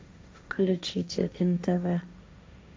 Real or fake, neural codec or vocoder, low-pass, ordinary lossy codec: fake; codec, 16 kHz, 1.1 kbps, Voila-Tokenizer; none; none